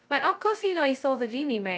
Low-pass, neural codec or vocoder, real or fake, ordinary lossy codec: none; codec, 16 kHz, 0.2 kbps, FocalCodec; fake; none